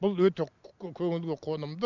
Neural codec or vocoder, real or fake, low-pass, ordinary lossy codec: none; real; 7.2 kHz; none